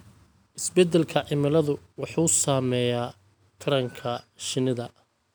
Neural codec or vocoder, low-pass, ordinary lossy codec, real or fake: none; none; none; real